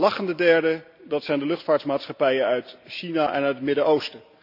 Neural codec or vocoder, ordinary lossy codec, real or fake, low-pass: none; none; real; 5.4 kHz